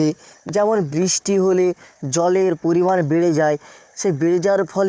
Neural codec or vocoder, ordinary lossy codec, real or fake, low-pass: codec, 16 kHz, 4 kbps, FunCodec, trained on Chinese and English, 50 frames a second; none; fake; none